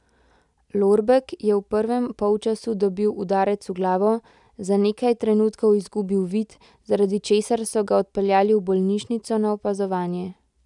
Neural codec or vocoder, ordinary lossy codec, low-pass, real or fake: none; none; 10.8 kHz; real